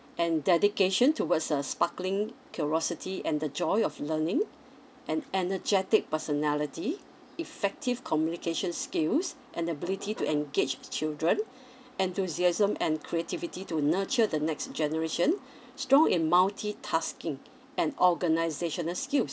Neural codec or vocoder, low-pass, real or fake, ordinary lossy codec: none; none; real; none